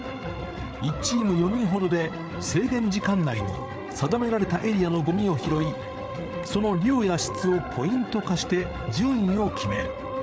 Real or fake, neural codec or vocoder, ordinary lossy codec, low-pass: fake; codec, 16 kHz, 8 kbps, FreqCodec, larger model; none; none